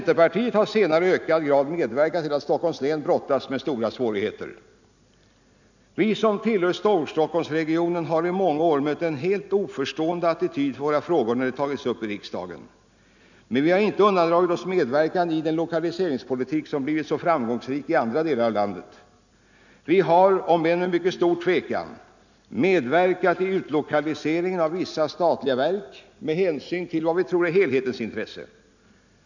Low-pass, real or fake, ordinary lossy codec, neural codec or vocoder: 7.2 kHz; real; none; none